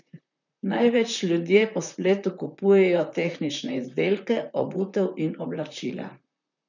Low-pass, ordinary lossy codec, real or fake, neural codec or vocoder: 7.2 kHz; none; fake; vocoder, 44.1 kHz, 128 mel bands, Pupu-Vocoder